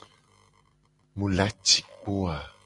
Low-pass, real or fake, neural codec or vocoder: 10.8 kHz; real; none